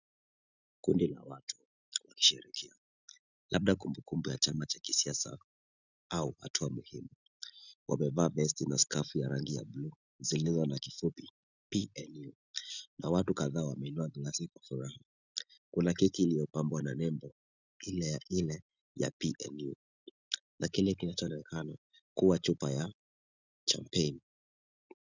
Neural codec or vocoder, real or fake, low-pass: none; real; 7.2 kHz